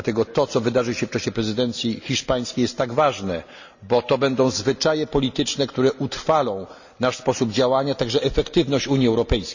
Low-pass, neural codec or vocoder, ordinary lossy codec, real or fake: 7.2 kHz; none; none; real